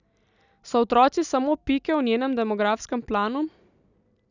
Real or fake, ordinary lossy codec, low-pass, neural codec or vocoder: real; none; 7.2 kHz; none